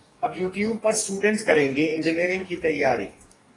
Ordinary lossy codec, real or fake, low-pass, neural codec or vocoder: AAC, 32 kbps; fake; 10.8 kHz; codec, 44.1 kHz, 2.6 kbps, DAC